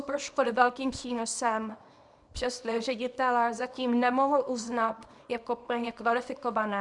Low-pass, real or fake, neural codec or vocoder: 10.8 kHz; fake; codec, 24 kHz, 0.9 kbps, WavTokenizer, small release